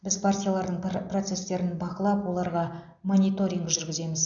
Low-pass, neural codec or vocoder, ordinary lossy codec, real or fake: 7.2 kHz; none; none; real